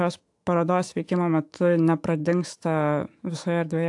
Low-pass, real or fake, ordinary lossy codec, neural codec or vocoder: 10.8 kHz; fake; AAC, 64 kbps; autoencoder, 48 kHz, 128 numbers a frame, DAC-VAE, trained on Japanese speech